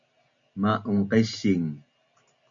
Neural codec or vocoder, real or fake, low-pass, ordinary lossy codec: none; real; 7.2 kHz; MP3, 64 kbps